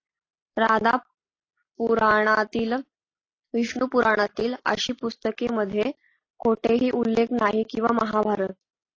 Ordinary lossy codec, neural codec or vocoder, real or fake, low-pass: AAC, 32 kbps; none; real; 7.2 kHz